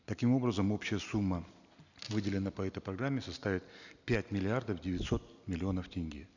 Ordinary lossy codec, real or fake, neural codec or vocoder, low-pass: none; real; none; 7.2 kHz